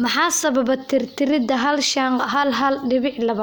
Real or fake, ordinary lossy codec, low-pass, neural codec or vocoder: real; none; none; none